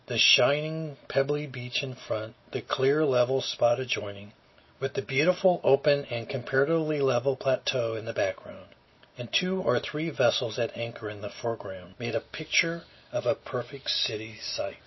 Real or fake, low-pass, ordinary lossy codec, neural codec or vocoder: real; 7.2 kHz; MP3, 24 kbps; none